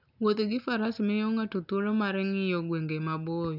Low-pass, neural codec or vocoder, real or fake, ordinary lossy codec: 5.4 kHz; none; real; none